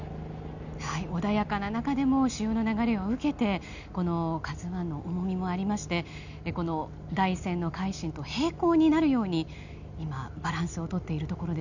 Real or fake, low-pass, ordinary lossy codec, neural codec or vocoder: real; 7.2 kHz; none; none